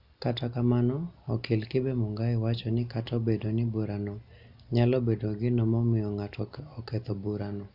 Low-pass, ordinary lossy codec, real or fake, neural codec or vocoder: 5.4 kHz; none; real; none